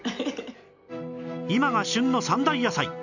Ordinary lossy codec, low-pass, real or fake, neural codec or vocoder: none; 7.2 kHz; real; none